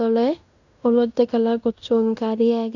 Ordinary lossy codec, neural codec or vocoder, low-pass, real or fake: none; codec, 16 kHz in and 24 kHz out, 0.9 kbps, LongCat-Audio-Codec, fine tuned four codebook decoder; 7.2 kHz; fake